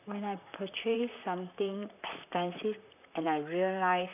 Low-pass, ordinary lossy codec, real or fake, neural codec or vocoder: 3.6 kHz; none; fake; vocoder, 44.1 kHz, 128 mel bands, Pupu-Vocoder